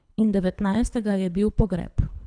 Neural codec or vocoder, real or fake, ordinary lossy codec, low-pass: codec, 24 kHz, 3 kbps, HILCodec; fake; none; 9.9 kHz